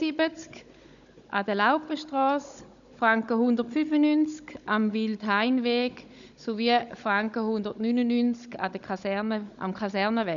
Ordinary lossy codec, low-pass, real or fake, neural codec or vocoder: none; 7.2 kHz; fake; codec, 16 kHz, 16 kbps, FunCodec, trained on Chinese and English, 50 frames a second